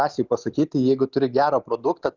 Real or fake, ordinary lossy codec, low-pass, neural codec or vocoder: real; Opus, 64 kbps; 7.2 kHz; none